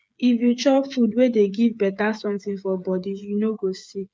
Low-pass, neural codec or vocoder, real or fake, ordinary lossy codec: none; codec, 16 kHz, 8 kbps, FreqCodec, smaller model; fake; none